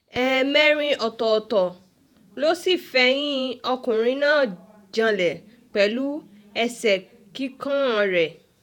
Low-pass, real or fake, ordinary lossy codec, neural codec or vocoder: 19.8 kHz; fake; none; vocoder, 48 kHz, 128 mel bands, Vocos